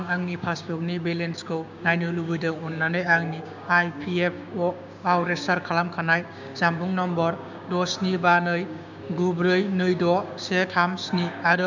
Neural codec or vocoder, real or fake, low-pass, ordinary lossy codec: codec, 16 kHz, 6 kbps, DAC; fake; 7.2 kHz; none